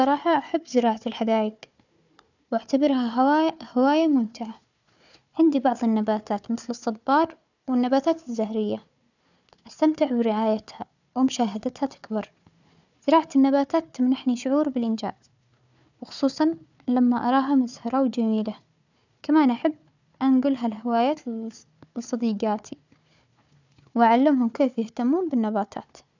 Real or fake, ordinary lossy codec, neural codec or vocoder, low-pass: fake; none; codec, 16 kHz, 4 kbps, FunCodec, trained on Chinese and English, 50 frames a second; 7.2 kHz